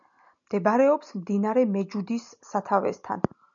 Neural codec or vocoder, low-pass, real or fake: none; 7.2 kHz; real